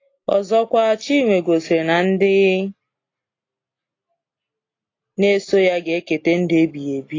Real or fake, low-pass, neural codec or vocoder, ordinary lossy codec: real; 7.2 kHz; none; AAC, 32 kbps